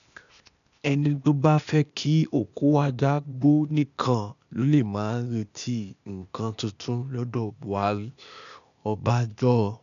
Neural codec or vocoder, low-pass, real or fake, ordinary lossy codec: codec, 16 kHz, 0.8 kbps, ZipCodec; 7.2 kHz; fake; none